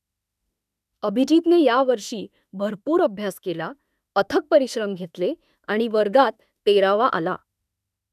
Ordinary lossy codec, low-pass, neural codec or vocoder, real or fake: none; 14.4 kHz; autoencoder, 48 kHz, 32 numbers a frame, DAC-VAE, trained on Japanese speech; fake